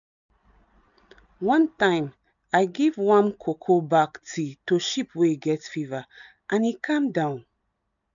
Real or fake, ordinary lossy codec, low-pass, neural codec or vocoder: real; none; 7.2 kHz; none